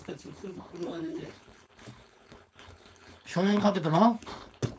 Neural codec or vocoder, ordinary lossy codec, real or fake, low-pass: codec, 16 kHz, 4.8 kbps, FACodec; none; fake; none